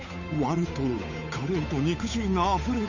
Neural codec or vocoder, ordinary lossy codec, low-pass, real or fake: codec, 16 kHz, 8 kbps, FunCodec, trained on Chinese and English, 25 frames a second; none; 7.2 kHz; fake